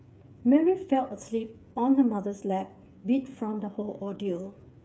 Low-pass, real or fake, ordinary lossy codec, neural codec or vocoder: none; fake; none; codec, 16 kHz, 8 kbps, FreqCodec, smaller model